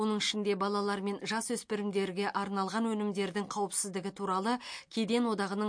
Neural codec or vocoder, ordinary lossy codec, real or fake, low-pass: none; MP3, 48 kbps; real; 9.9 kHz